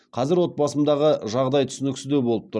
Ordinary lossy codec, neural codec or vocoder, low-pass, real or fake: none; none; none; real